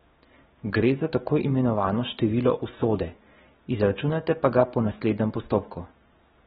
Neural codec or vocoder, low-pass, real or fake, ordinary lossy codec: none; 7.2 kHz; real; AAC, 16 kbps